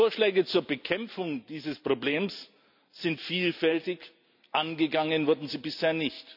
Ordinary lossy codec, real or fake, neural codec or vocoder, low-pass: none; real; none; 5.4 kHz